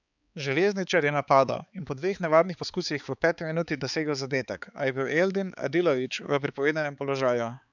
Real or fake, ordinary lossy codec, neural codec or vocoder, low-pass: fake; none; codec, 16 kHz, 4 kbps, X-Codec, HuBERT features, trained on balanced general audio; 7.2 kHz